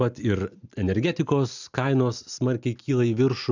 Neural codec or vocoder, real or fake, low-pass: none; real; 7.2 kHz